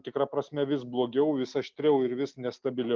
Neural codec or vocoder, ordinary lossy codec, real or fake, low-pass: none; Opus, 24 kbps; real; 7.2 kHz